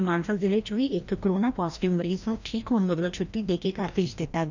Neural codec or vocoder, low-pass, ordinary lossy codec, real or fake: codec, 16 kHz, 1 kbps, FreqCodec, larger model; 7.2 kHz; none; fake